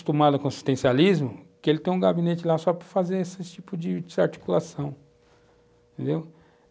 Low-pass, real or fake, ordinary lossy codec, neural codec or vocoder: none; real; none; none